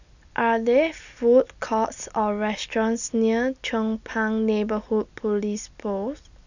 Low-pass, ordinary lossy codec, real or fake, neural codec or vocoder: 7.2 kHz; none; real; none